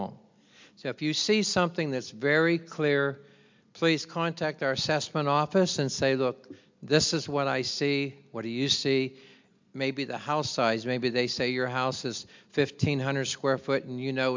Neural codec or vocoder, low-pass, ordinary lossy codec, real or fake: none; 7.2 kHz; MP3, 64 kbps; real